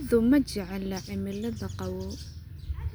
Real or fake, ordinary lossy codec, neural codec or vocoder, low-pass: real; none; none; none